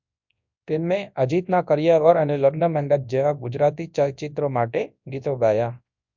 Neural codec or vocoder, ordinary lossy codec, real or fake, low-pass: codec, 24 kHz, 0.9 kbps, WavTokenizer, large speech release; MP3, 64 kbps; fake; 7.2 kHz